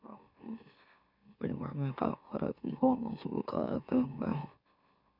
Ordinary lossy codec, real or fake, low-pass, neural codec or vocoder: none; fake; 5.4 kHz; autoencoder, 44.1 kHz, a latent of 192 numbers a frame, MeloTTS